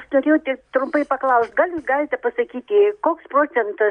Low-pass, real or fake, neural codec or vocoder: 9.9 kHz; real; none